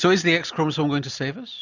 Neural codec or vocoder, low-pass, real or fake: none; 7.2 kHz; real